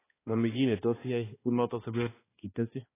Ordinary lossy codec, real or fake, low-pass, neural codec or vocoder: AAC, 16 kbps; fake; 3.6 kHz; codec, 16 kHz, 2 kbps, X-Codec, HuBERT features, trained on balanced general audio